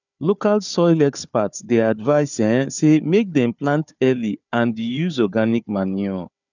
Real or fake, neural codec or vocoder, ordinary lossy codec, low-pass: fake; codec, 16 kHz, 4 kbps, FunCodec, trained on Chinese and English, 50 frames a second; none; 7.2 kHz